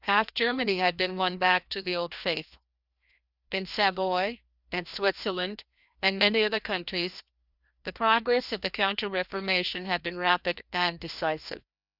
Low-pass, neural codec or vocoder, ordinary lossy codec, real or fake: 5.4 kHz; codec, 16 kHz, 1 kbps, FreqCodec, larger model; Opus, 64 kbps; fake